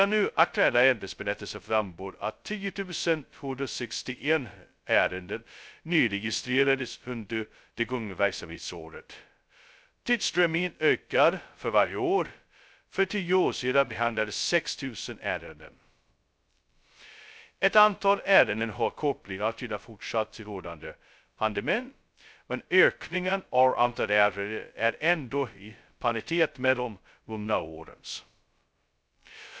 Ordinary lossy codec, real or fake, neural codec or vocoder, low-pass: none; fake; codec, 16 kHz, 0.2 kbps, FocalCodec; none